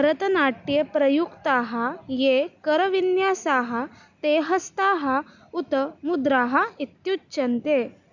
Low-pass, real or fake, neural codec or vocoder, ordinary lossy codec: 7.2 kHz; real; none; none